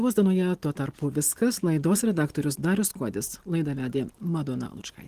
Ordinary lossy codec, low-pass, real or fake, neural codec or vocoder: Opus, 16 kbps; 14.4 kHz; real; none